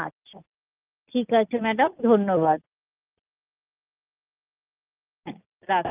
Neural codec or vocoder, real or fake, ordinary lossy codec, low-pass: none; real; Opus, 24 kbps; 3.6 kHz